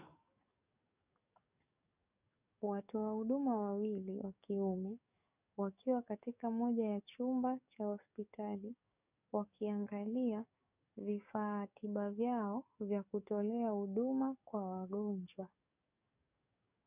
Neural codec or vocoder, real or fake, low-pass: codec, 44.1 kHz, 7.8 kbps, DAC; fake; 3.6 kHz